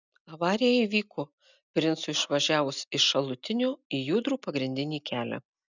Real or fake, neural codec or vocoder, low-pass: real; none; 7.2 kHz